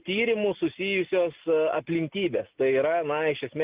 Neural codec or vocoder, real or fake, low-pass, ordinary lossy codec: none; real; 3.6 kHz; Opus, 24 kbps